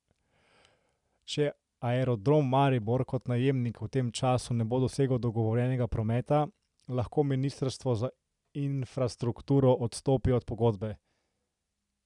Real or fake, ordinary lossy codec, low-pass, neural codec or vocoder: real; none; 10.8 kHz; none